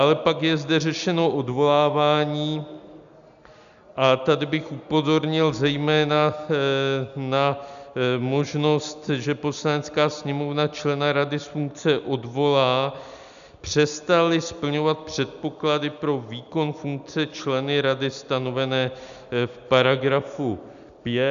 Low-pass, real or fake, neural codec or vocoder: 7.2 kHz; real; none